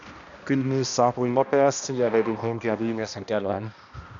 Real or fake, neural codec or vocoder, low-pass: fake; codec, 16 kHz, 1 kbps, X-Codec, HuBERT features, trained on balanced general audio; 7.2 kHz